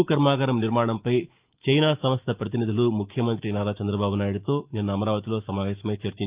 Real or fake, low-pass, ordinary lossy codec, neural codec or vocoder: fake; 3.6 kHz; Opus, 24 kbps; autoencoder, 48 kHz, 128 numbers a frame, DAC-VAE, trained on Japanese speech